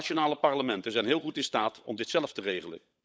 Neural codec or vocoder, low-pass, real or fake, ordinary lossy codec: codec, 16 kHz, 16 kbps, FunCodec, trained on LibriTTS, 50 frames a second; none; fake; none